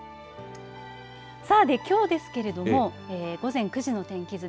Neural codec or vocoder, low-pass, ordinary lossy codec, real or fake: none; none; none; real